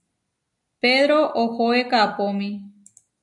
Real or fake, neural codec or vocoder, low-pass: real; none; 10.8 kHz